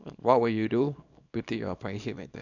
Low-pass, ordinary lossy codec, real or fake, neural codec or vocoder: 7.2 kHz; none; fake; codec, 24 kHz, 0.9 kbps, WavTokenizer, small release